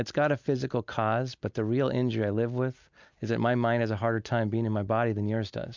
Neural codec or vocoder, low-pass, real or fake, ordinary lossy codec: none; 7.2 kHz; real; MP3, 64 kbps